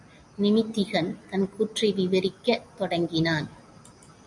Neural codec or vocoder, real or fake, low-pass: none; real; 10.8 kHz